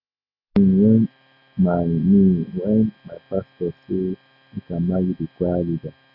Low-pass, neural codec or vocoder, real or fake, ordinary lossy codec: 5.4 kHz; none; real; AAC, 48 kbps